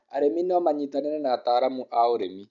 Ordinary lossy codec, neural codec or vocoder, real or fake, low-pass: none; none; real; 7.2 kHz